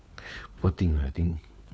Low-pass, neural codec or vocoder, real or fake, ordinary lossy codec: none; codec, 16 kHz, 4 kbps, FunCodec, trained on LibriTTS, 50 frames a second; fake; none